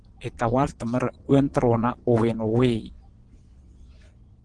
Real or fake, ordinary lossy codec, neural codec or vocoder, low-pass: fake; Opus, 16 kbps; vocoder, 22.05 kHz, 80 mel bands, Vocos; 9.9 kHz